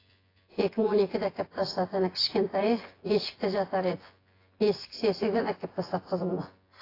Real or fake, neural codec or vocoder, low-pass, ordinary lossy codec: fake; vocoder, 24 kHz, 100 mel bands, Vocos; 5.4 kHz; AAC, 24 kbps